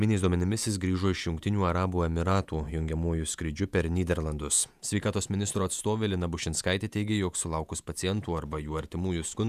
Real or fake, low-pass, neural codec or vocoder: fake; 14.4 kHz; vocoder, 48 kHz, 128 mel bands, Vocos